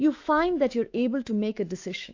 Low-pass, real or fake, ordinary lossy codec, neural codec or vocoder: 7.2 kHz; fake; AAC, 48 kbps; autoencoder, 48 kHz, 128 numbers a frame, DAC-VAE, trained on Japanese speech